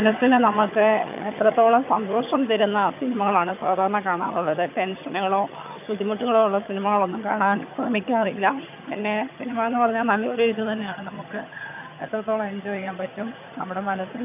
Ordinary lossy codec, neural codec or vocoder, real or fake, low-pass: none; vocoder, 22.05 kHz, 80 mel bands, HiFi-GAN; fake; 3.6 kHz